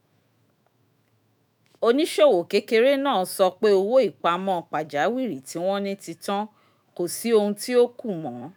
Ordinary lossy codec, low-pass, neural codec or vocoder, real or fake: none; none; autoencoder, 48 kHz, 128 numbers a frame, DAC-VAE, trained on Japanese speech; fake